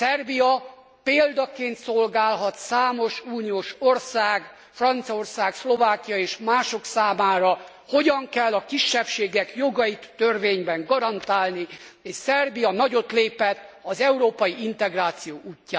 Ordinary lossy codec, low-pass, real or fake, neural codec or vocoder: none; none; real; none